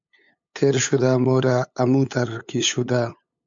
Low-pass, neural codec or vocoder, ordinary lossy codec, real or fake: 7.2 kHz; codec, 16 kHz, 8 kbps, FunCodec, trained on LibriTTS, 25 frames a second; MP3, 64 kbps; fake